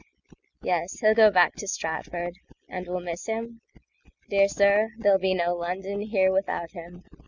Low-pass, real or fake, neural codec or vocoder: 7.2 kHz; real; none